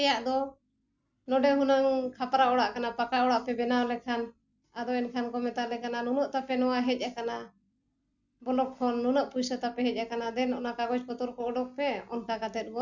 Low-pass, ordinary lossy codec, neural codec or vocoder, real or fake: 7.2 kHz; none; none; real